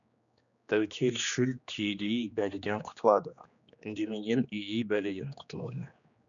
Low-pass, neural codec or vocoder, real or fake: 7.2 kHz; codec, 16 kHz, 2 kbps, X-Codec, HuBERT features, trained on general audio; fake